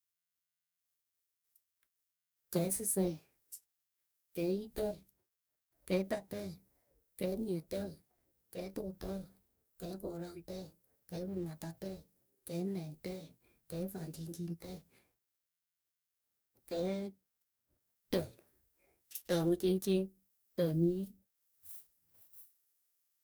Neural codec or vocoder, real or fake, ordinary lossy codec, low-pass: codec, 44.1 kHz, 2.6 kbps, DAC; fake; none; none